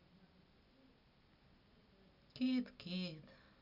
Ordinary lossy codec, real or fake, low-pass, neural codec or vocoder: none; real; 5.4 kHz; none